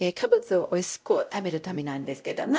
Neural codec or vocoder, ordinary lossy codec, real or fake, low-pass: codec, 16 kHz, 0.5 kbps, X-Codec, WavLM features, trained on Multilingual LibriSpeech; none; fake; none